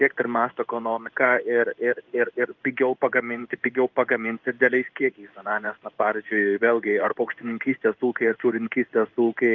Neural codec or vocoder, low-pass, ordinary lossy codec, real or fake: codec, 16 kHz in and 24 kHz out, 1 kbps, XY-Tokenizer; 7.2 kHz; Opus, 32 kbps; fake